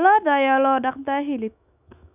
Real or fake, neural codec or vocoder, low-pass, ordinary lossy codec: fake; autoencoder, 48 kHz, 128 numbers a frame, DAC-VAE, trained on Japanese speech; 3.6 kHz; none